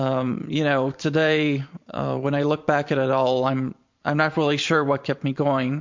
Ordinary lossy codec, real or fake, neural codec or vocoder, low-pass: MP3, 48 kbps; fake; vocoder, 44.1 kHz, 128 mel bands every 512 samples, BigVGAN v2; 7.2 kHz